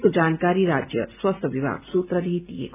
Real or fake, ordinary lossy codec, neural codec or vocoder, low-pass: real; none; none; 3.6 kHz